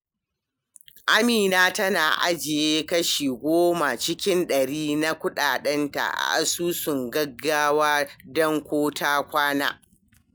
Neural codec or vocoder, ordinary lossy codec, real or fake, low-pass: none; none; real; none